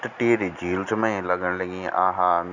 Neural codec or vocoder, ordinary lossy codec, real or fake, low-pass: none; none; real; 7.2 kHz